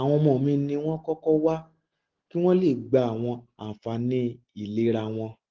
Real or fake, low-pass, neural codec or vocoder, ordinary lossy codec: real; 7.2 kHz; none; Opus, 16 kbps